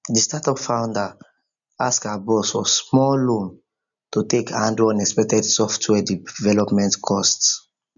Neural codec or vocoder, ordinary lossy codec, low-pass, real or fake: none; none; 7.2 kHz; real